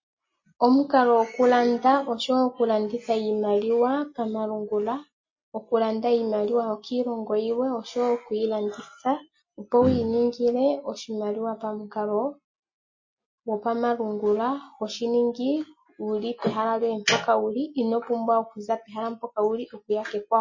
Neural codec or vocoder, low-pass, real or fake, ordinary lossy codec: none; 7.2 kHz; real; MP3, 32 kbps